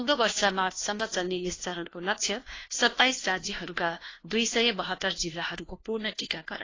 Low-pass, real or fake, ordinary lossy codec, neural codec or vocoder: 7.2 kHz; fake; AAC, 32 kbps; codec, 16 kHz, 1 kbps, FunCodec, trained on LibriTTS, 50 frames a second